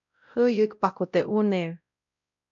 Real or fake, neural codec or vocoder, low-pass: fake; codec, 16 kHz, 0.5 kbps, X-Codec, WavLM features, trained on Multilingual LibriSpeech; 7.2 kHz